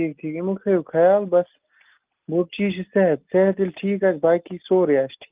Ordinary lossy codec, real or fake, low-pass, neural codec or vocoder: Opus, 24 kbps; real; 3.6 kHz; none